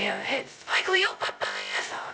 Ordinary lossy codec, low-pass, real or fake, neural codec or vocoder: none; none; fake; codec, 16 kHz, 0.2 kbps, FocalCodec